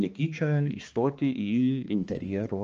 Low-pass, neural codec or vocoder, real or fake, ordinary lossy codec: 7.2 kHz; codec, 16 kHz, 2 kbps, X-Codec, HuBERT features, trained on balanced general audio; fake; Opus, 24 kbps